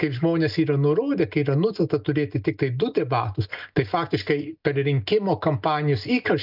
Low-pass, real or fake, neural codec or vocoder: 5.4 kHz; real; none